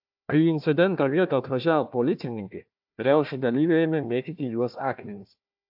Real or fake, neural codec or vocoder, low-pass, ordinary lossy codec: fake; codec, 16 kHz, 1 kbps, FunCodec, trained on Chinese and English, 50 frames a second; 5.4 kHz; AAC, 48 kbps